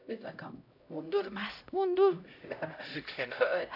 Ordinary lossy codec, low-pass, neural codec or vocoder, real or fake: AAC, 48 kbps; 5.4 kHz; codec, 16 kHz, 0.5 kbps, X-Codec, HuBERT features, trained on LibriSpeech; fake